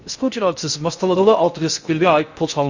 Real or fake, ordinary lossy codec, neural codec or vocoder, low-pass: fake; Opus, 64 kbps; codec, 16 kHz in and 24 kHz out, 0.6 kbps, FocalCodec, streaming, 2048 codes; 7.2 kHz